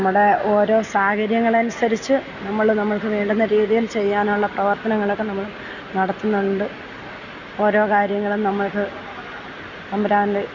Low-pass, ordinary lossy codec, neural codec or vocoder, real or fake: 7.2 kHz; none; none; real